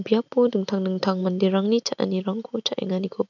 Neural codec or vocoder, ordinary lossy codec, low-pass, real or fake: none; none; 7.2 kHz; real